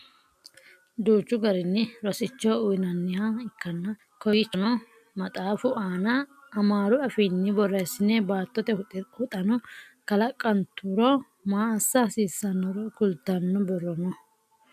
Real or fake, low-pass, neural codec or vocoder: real; 14.4 kHz; none